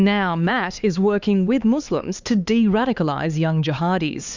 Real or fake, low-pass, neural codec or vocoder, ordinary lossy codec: fake; 7.2 kHz; codec, 16 kHz, 4 kbps, X-Codec, HuBERT features, trained on LibriSpeech; Opus, 64 kbps